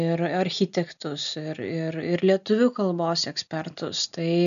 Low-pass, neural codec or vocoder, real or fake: 7.2 kHz; none; real